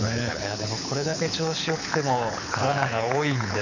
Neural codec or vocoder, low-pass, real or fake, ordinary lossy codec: codec, 24 kHz, 6 kbps, HILCodec; 7.2 kHz; fake; none